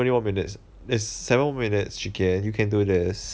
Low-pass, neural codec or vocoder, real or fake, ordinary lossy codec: none; none; real; none